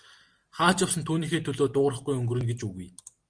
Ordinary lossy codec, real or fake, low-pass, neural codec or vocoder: Opus, 24 kbps; real; 9.9 kHz; none